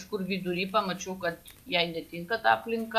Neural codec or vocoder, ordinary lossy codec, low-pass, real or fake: none; AAC, 96 kbps; 14.4 kHz; real